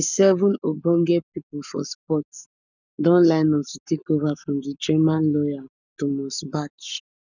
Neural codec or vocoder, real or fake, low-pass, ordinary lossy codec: codec, 44.1 kHz, 7.8 kbps, Pupu-Codec; fake; 7.2 kHz; none